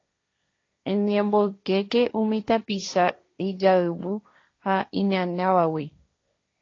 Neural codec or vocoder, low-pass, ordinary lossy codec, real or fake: codec, 16 kHz, 1.1 kbps, Voila-Tokenizer; 7.2 kHz; AAC, 32 kbps; fake